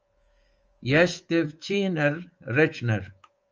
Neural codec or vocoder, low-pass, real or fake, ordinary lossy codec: codec, 24 kHz, 3.1 kbps, DualCodec; 7.2 kHz; fake; Opus, 24 kbps